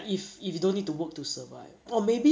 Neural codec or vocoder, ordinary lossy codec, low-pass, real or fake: none; none; none; real